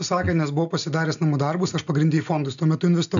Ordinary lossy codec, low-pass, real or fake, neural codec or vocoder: AAC, 96 kbps; 7.2 kHz; real; none